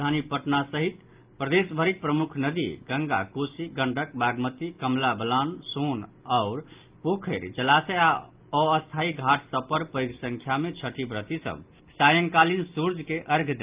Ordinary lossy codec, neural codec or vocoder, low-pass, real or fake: Opus, 32 kbps; none; 3.6 kHz; real